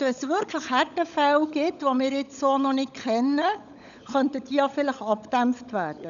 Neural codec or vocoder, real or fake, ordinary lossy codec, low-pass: codec, 16 kHz, 16 kbps, FunCodec, trained on LibriTTS, 50 frames a second; fake; none; 7.2 kHz